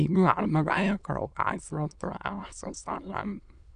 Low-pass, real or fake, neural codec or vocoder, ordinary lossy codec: 9.9 kHz; fake; autoencoder, 22.05 kHz, a latent of 192 numbers a frame, VITS, trained on many speakers; none